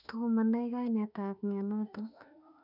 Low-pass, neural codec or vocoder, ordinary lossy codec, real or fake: 5.4 kHz; autoencoder, 48 kHz, 32 numbers a frame, DAC-VAE, trained on Japanese speech; none; fake